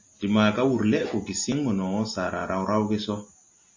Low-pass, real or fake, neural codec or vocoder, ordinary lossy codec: 7.2 kHz; real; none; MP3, 32 kbps